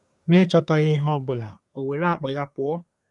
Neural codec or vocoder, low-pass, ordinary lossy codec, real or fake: codec, 24 kHz, 1 kbps, SNAC; 10.8 kHz; none; fake